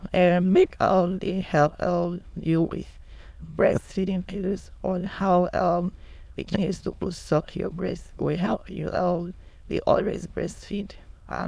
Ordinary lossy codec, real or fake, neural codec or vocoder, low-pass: none; fake; autoencoder, 22.05 kHz, a latent of 192 numbers a frame, VITS, trained on many speakers; none